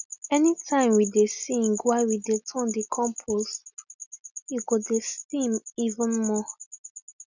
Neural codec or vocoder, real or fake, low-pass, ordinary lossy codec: none; real; 7.2 kHz; none